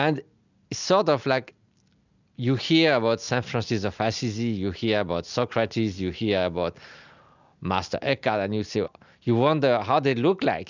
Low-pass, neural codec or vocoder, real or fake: 7.2 kHz; none; real